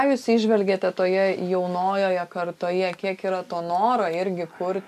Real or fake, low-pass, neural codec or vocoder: real; 14.4 kHz; none